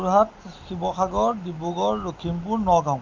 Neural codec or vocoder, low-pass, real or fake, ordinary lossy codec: none; 7.2 kHz; real; Opus, 24 kbps